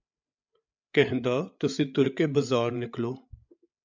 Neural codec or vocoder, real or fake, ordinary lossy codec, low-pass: codec, 16 kHz, 8 kbps, FreqCodec, larger model; fake; MP3, 64 kbps; 7.2 kHz